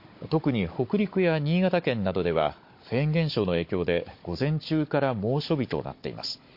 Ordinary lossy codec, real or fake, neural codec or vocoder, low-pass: MP3, 32 kbps; fake; codec, 16 kHz, 16 kbps, FunCodec, trained on Chinese and English, 50 frames a second; 5.4 kHz